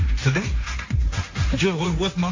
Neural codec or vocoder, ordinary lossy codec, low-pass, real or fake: codec, 16 kHz, 1.1 kbps, Voila-Tokenizer; AAC, 48 kbps; 7.2 kHz; fake